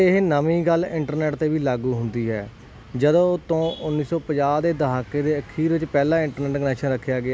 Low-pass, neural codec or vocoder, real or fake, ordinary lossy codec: none; none; real; none